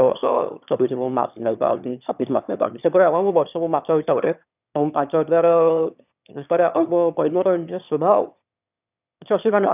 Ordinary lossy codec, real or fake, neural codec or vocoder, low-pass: none; fake; autoencoder, 22.05 kHz, a latent of 192 numbers a frame, VITS, trained on one speaker; 3.6 kHz